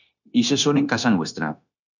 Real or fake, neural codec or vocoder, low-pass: fake; codec, 16 kHz, 0.9 kbps, LongCat-Audio-Codec; 7.2 kHz